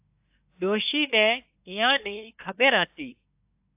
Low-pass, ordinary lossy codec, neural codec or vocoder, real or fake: 3.6 kHz; AAC, 32 kbps; codec, 24 kHz, 1 kbps, SNAC; fake